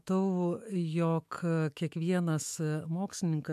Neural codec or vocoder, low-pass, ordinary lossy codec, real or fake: autoencoder, 48 kHz, 128 numbers a frame, DAC-VAE, trained on Japanese speech; 14.4 kHz; MP3, 96 kbps; fake